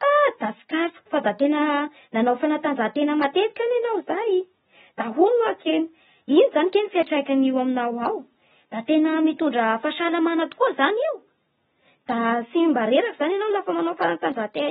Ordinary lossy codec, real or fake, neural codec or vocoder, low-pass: AAC, 16 kbps; real; none; 19.8 kHz